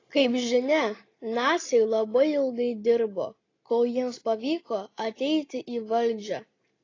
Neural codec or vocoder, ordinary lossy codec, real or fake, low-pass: none; AAC, 32 kbps; real; 7.2 kHz